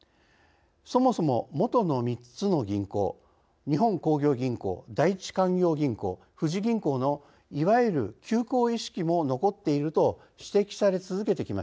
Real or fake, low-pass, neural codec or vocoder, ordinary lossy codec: real; none; none; none